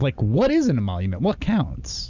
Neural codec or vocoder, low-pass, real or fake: none; 7.2 kHz; real